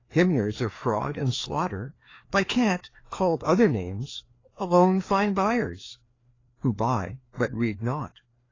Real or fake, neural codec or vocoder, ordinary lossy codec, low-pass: fake; codec, 16 kHz, 2 kbps, FreqCodec, larger model; AAC, 32 kbps; 7.2 kHz